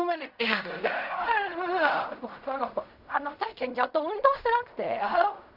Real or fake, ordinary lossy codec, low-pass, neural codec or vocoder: fake; none; 5.4 kHz; codec, 16 kHz in and 24 kHz out, 0.4 kbps, LongCat-Audio-Codec, fine tuned four codebook decoder